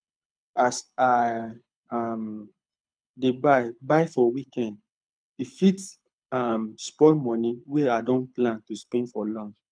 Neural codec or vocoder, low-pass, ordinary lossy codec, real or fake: codec, 24 kHz, 6 kbps, HILCodec; 9.9 kHz; none; fake